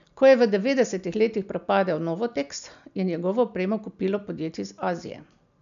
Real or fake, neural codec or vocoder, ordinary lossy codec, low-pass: real; none; none; 7.2 kHz